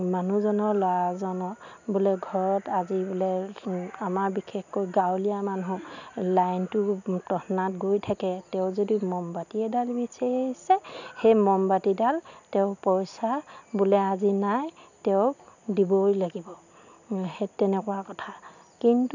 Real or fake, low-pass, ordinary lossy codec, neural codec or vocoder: real; 7.2 kHz; none; none